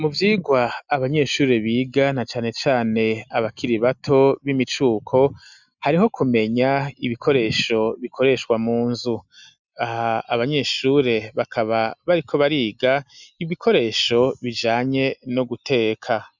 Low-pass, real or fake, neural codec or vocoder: 7.2 kHz; real; none